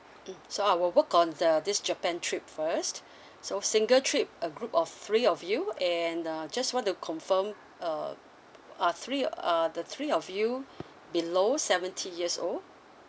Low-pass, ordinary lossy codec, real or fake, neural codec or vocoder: none; none; real; none